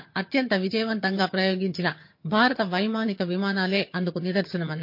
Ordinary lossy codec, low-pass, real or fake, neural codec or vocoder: MP3, 32 kbps; 5.4 kHz; fake; vocoder, 22.05 kHz, 80 mel bands, HiFi-GAN